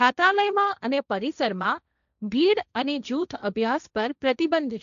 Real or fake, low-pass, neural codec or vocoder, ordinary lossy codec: fake; 7.2 kHz; codec, 16 kHz, 1.1 kbps, Voila-Tokenizer; none